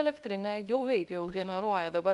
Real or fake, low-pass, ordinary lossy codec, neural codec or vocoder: fake; 10.8 kHz; AAC, 64 kbps; codec, 24 kHz, 0.9 kbps, WavTokenizer, small release